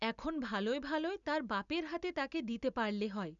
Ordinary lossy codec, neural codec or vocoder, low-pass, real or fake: none; none; 7.2 kHz; real